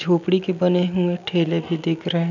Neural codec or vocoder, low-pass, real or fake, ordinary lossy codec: none; 7.2 kHz; real; none